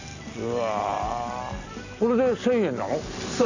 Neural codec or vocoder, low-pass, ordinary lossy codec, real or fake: none; 7.2 kHz; none; real